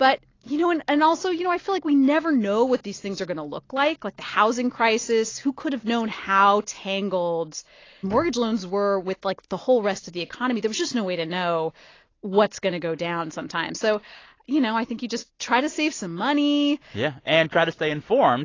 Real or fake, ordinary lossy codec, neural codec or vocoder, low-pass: real; AAC, 32 kbps; none; 7.2 kHz